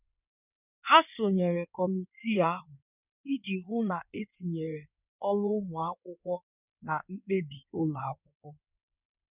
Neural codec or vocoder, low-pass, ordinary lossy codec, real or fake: codec, 16 kHz in and 24 kHz out, 2.2 kbps, FireRedTTS-2 codec; 3.6 kHz; none; fake